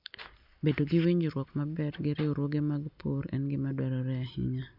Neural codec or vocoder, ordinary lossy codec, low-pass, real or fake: none; none; 5.4 kHz; real